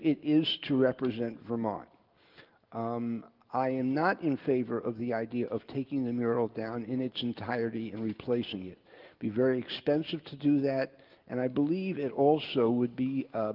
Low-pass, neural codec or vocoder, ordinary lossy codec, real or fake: 5.4 kHz; none; Opus, 32 kbps; real